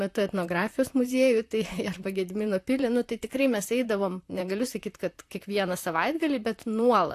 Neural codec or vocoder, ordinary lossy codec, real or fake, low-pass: vocoder, 44.1 kHz, 128 mel bands, Pupu-Vocoder; AAC, 64 kbps; fake; 14.4 kHz